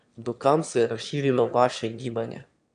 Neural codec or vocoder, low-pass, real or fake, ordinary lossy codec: autoencoder, 22.05 kHz, a latent of 192 numbers a frame, VITS, trained on one speaker; 9.9 kHz; fake; MP3, 96 kbps